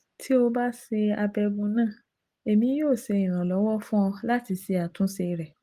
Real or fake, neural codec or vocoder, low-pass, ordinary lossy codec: real; none; 14.4 kHz; Opus, 24 kbps